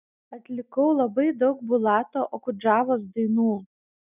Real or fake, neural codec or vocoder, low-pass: real; none; 3.6 kHz